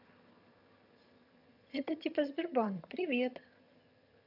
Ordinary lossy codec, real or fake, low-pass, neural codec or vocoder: AAC, 48 kbps; fake; 5.4 kHz; vocoder, 22.05 kHz, 80 mel bands, HiFi-GAN